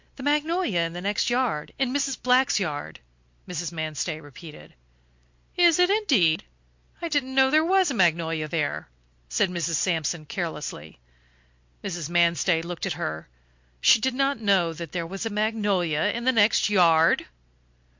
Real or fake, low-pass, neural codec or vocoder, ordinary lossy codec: real; 7.2 kHz; none; MP3, 48 kbps